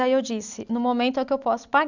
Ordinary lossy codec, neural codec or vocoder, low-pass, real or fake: none; none; 7.2 kHz; real